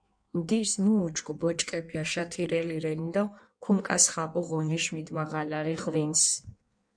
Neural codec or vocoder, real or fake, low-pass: codec, 16 kHz in and 24 kHz out, 1.1 kbps, FireRedTTS-2 codec; fake; 9.9 kHz